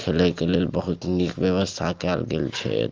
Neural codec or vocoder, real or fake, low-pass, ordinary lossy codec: none; real; 7.2 kHz; Opus, 32 kbps